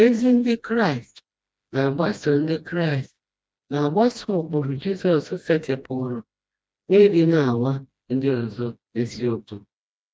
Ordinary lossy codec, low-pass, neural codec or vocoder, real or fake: none; none; codec, 16 kHz, 1 kbps, FreqCodec, smaller model; fake